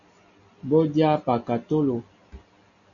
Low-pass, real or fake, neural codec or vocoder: 7.2 kHz; real; none